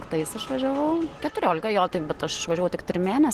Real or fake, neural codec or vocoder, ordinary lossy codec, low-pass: real; none; Opus, 16 kbps; 14.4 kHz